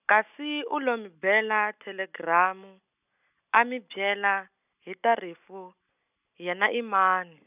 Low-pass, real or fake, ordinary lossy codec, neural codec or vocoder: 3.6 kHz; real; none; none